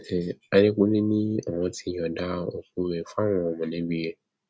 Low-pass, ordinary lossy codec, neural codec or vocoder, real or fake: none; none; none; real